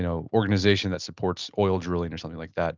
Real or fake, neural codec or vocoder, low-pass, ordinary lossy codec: real; none; 7.2 kHz; Opus, 24 kbps